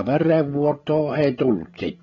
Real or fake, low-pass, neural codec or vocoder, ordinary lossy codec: fake; 7.2 kHz; codec, 16 kHz, 16 kbps, FreqCodec, larger model; AAC, 24 kbps